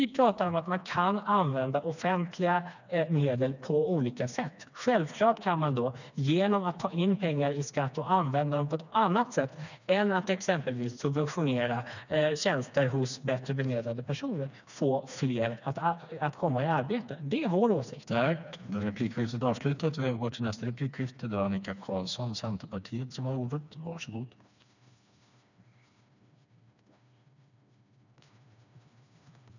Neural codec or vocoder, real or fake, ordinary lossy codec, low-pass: codec, 16 kHz, 2 kbps, FreqCodec, smaller model; fake; none; 7.2 kHz